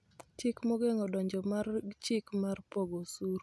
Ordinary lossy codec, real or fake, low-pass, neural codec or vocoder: none; real; none; none